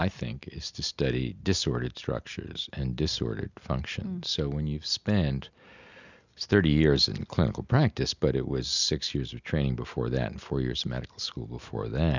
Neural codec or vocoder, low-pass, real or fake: none; 7.2 kHz; real